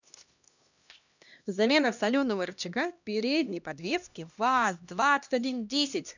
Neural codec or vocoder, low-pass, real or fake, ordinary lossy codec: codec, 16 kHz, 1 kbps, X-Codec, HuBERT features, trained on LibriSpeech; 7.2 kHz; fake; none